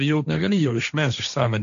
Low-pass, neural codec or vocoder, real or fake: 7.2 kHz; codec, 16 kHz, 1.1 kbps, Voila-Tokenizer; fake